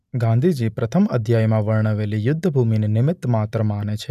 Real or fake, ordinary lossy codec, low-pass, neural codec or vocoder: real; none; 14.4 kHz; none